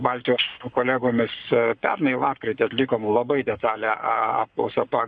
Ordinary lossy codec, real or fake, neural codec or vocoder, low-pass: MP3, 96 kbps; fake; codec, 44.1 kHz, 7.8 kbps, Pupu-Codec; 9.9 kHz